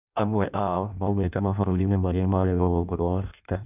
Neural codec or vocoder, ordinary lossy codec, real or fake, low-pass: codec, 16 kHz in and 24 kHz out, 0.6 kbps, FireRedTTS-2 codec; none; fake; 3.6 kHz